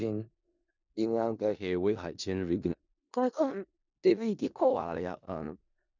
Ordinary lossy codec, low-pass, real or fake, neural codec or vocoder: none; 7.2 kHz; fake; codec, 16 kHz in and 24 kHz out, 0.4 kbps, LongCat-Audio-Codec, four codebook decoder